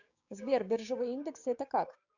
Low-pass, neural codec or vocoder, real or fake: 7.2 kHz; codec, 16 kHz, 6 kbps, DAC; fake